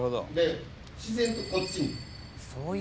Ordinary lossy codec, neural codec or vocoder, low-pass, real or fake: none; none; none; real